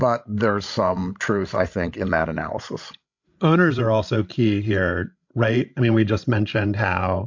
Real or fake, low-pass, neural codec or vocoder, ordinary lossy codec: fake; 7.2 kHz; codec, 16 kHz, 16 kbps, FreqCodec, larger model; MP3, 48 kbps